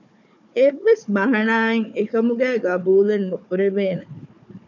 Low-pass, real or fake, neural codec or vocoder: 7.2 kHz; fake; codec, 16 kHz, 4 kbps, FunCodec, trained on Chinese and English, 50 frames a second